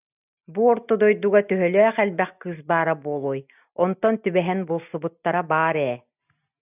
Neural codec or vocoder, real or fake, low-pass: none; real; 3.6 kHz